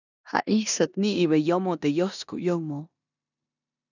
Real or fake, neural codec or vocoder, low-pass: fake; codec, 16 kHz in and 24 kHz out, 0.9 kbps, LongCat-Audio-Codec, four codebook decoder; 7.2 kHz